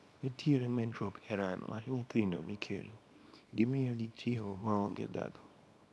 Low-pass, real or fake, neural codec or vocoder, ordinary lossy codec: none; fake; codec, 24 kHz, 0.9 kbps, WavTokenizer, small release; none